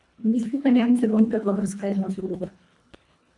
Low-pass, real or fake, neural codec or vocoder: 10.8 kHz; fake; codec, 24 kHz, 1.5 kbps, HILCodec